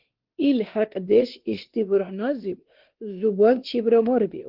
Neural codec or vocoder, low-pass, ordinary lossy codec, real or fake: codec, 16 kHz, 2 kbps, X-Codec, WavLM features, trained on Multilingual LibriSpeech; 5.4 kHz; Opus, 16 kbps; fake